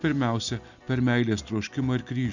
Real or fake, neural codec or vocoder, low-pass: real; none; 7.2 kHz